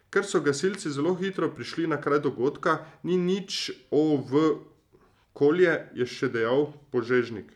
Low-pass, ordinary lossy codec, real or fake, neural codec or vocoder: 19.8 kHz; none; real; none